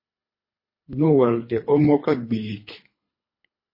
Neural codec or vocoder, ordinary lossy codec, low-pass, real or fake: codec, 24 kHz, 3 kbps, HILCodec; MP3, 24 kbps; 5.4 kHz; fake